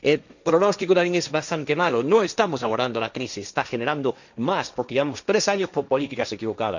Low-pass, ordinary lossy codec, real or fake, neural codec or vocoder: 7.2 kHz; none; fake; codec, 16 kHz, 1.1 kbps, Voila-Tokenizer